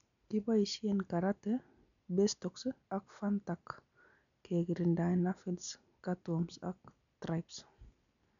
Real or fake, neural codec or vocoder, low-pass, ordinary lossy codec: real; none; 7.2 kHz; none